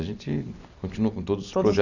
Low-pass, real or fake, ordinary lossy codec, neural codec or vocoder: 7.2 kHz; real; none; none